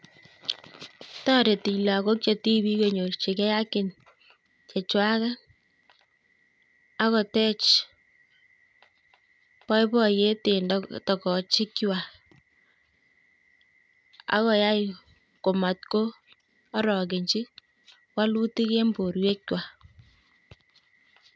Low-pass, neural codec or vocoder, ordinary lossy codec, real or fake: none; none; none; real